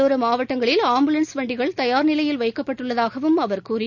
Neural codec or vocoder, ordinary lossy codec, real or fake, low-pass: none; none; real; 7.2 kHz